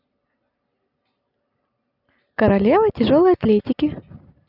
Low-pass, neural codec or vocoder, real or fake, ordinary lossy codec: 5.4 kHz; none; real; none